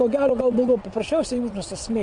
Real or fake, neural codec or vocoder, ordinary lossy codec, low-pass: fake; vocoder, 22.05 kHz, 80 mel bands, WaveNeXt; MP3, 48 kbps; 9.9 kHz